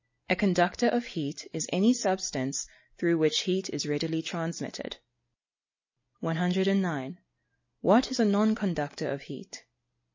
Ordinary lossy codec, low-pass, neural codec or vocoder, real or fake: MP3, 32 kbps; 7.2 kHz; none; real